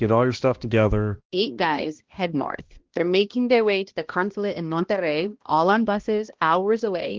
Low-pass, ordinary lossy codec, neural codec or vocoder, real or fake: 7.2 kHz; Opus, 16 kbps; codec, 16 kHz, 1 kbps, X-Codec, HuBERT features, trained on balanced general audio; fake